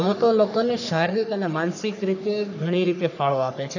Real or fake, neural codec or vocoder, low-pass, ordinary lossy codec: fake; codec, 44.1 kHz, 3.4 kbps, Pupu-Codec; 7.2 kHz; none